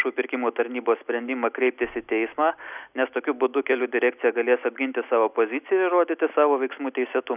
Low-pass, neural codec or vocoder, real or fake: 3.6 kHz; none; real